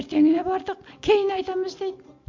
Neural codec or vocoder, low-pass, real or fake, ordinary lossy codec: none; 7.2 kHz; real; MP3, 48 kbps